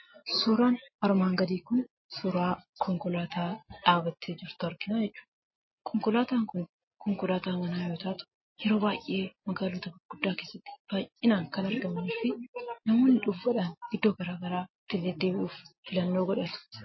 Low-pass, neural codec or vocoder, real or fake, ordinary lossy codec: 7.2 kHz; none; real; MP3, 24 kbps